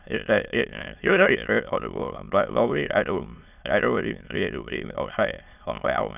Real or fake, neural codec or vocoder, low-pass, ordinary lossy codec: fake; autoencoder, 22.05 kHz, a latent of 192 numbers a frame, VITS, trained on many speakers; 3.6 kHz; none